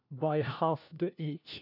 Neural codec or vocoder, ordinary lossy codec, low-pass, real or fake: codec, 16 kHz, 1 kbps, FunCodec, trained on LibriTTS, 50 frames a second; none; 5.4 kHz; fake